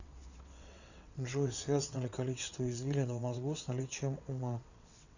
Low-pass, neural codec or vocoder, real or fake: 7.2 kHz; vocoder, 22.05 kHz, 80 mel bands, WaveNeXt; fake